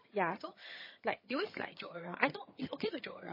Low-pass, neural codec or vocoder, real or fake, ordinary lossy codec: 5.4 kHz; vocoder, 22.05 kHz, 80 mel bands, HiFi-GAN; fake; MP3, 24 kbps